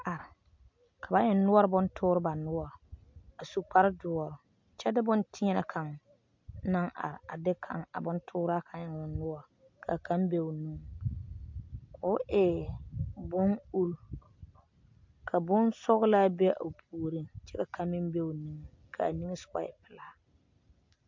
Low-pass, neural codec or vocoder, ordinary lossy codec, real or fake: 7.2 kHz; none; MP3, 48 kbps; real